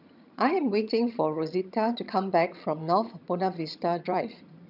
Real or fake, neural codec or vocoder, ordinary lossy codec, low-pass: fake; vocoder, 22.05 kHz, 80 mel bands, HiFi-GAN; none; 5.4 kHz